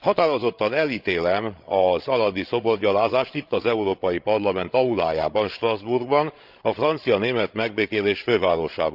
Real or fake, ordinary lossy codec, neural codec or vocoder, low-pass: fake; Opus, 24 kbps; codec, 16 kHz, 16 kbps, FreqCodec, larger model; 5.4 kHz